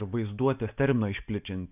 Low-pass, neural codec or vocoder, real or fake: 3.6 kHz; none; real